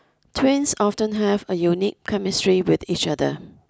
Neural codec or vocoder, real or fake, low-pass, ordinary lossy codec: none; real; none; none